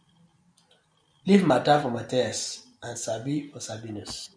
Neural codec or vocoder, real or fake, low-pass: none; real; 9.9 kHz